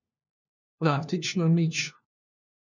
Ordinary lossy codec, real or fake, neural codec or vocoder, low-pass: none; fake; codec, 16 kHz, 1 kbps, FunCodec, trained on LibriTTS, 50 frames a second; 7.2 kHz